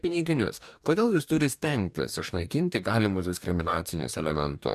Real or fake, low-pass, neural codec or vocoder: fake; 14.4 kHz; codec, 44.1 kHz, 2.6 kbps, DAC